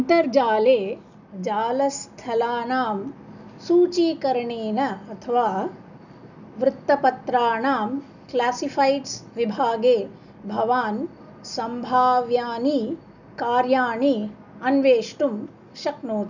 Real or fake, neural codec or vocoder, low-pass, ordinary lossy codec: real; none; 7.2 kHz; none